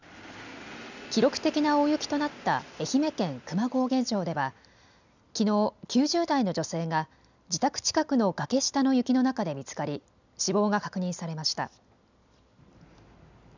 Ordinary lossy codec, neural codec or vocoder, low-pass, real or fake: none; none; 7.2 kHz; real